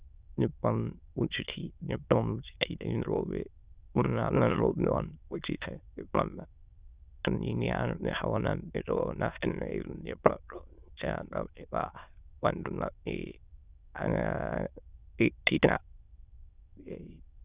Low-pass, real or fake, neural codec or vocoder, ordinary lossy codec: 3.6 kHz; fake; autoencoder, 22.05 kHz, a latent of 192 numbers a frame, VITS, trained on many speakers; Opus, 64 kbps